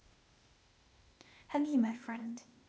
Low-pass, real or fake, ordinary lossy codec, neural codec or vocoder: none; fake; none; codec, 16 kHz, 0.8 kbps, ZipCodec